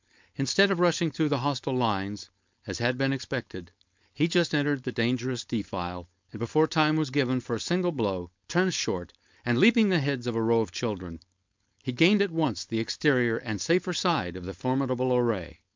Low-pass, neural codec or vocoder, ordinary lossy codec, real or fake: 7.2 kHz; codec, 16 kHz, 4.8 kbps, FACodec; MP3, 64 kbps; fake